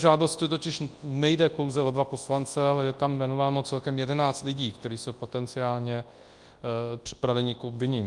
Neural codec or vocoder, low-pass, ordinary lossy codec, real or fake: codec, 24 kHz, 0.9 kbps, WavTokenizer, large speech release; 10.8 kHz; Opus, 32 kbps; fake